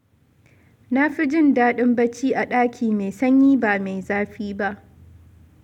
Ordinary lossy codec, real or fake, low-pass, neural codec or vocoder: none; real; 19.8 kHz; none